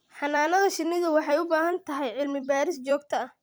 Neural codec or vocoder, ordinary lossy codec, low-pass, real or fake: vocoder, 44.1 kHz, 128 mel bands every 256 samples, BigVGAN v2; none; none; fake